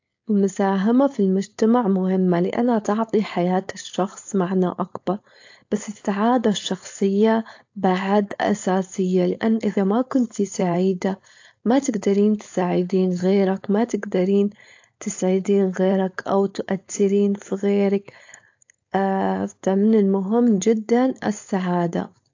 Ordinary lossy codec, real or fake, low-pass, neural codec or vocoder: AAC, 48 kbps; fake; 7.2 kHz; codec, 16 kHz, 4.8 kbps, FACodec